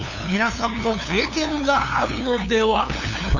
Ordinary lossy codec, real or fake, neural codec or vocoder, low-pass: none; fake; codec, 16 kHz, 2 kbps, FunCodec, trained on LibriTTS, 25 frames a second; 7.2 kHz